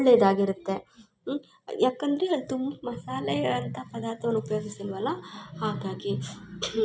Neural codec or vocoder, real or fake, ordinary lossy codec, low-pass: none; real; none; none